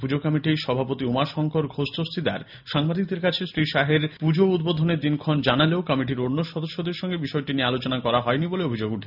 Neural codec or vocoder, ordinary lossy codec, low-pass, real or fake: none; none; 5.4 kHz; real